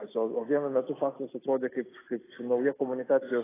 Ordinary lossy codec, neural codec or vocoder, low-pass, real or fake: AAC, 16 kbps; none; 3.6 kHz; real